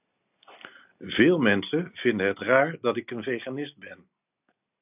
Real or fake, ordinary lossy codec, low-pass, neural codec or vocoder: real; AAC, 32 kbps; 3.6 kHz; none